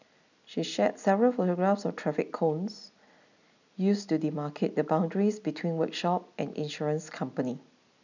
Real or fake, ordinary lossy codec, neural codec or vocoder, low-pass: real; none; none; 7.2 kHz